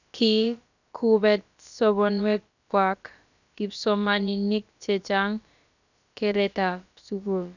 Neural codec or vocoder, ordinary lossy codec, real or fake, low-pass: codec, 16 kHz, about 1 kbps, DyCAST, with the encoder's durations; none; fake; 7.2 kHz